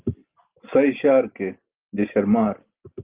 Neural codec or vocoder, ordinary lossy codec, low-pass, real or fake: none; Opus, 32 kbps; 3.6 kHz; real